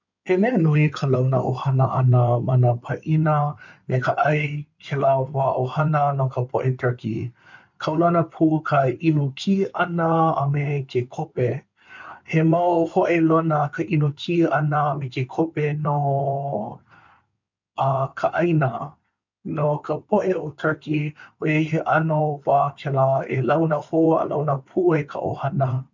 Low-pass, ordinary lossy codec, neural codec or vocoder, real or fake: 7.2 kHz; none; codec, 16 kHz in and 24 kHz out, 2.2 kbps, FireRedTTS-2 codec; fake